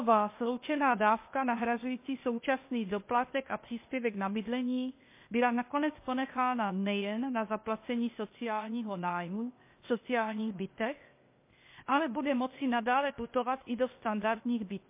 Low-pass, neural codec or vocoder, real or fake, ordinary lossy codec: 3.6 kHz; codec, 16 kHz, 0.8 kbps, ZipCodec; fake; MP3, 24 kbps